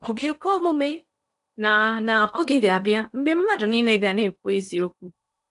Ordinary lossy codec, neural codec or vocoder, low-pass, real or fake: none; codec, 16 kHz in and 24 kHz out, 0.8 kbps, FocalCodec, streaming, 65536 codes; 10.8 kHz; fake